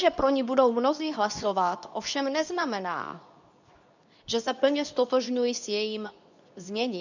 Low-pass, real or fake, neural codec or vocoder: 7.2 kHz; fake; codec, 24 kHz, 0.9 kbps, WavTokenizer, medium speech release version 2